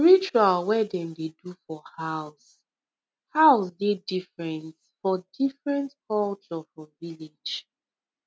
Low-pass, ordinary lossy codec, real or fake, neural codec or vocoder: none; none; real; none